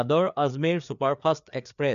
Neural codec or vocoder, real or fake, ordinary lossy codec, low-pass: codec, 16 kHz, 4 kbps, FreqCodec, larger model; fake; MP3, 96 kbps; 7.2 kHz